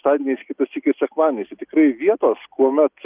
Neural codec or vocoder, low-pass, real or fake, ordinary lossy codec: none; 3.6 kHz; real; Opus, 32 kbps